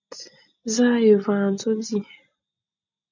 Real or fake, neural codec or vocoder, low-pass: real; none; 7.2 kHz